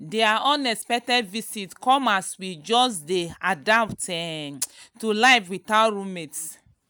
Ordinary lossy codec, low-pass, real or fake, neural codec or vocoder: none; none; real; none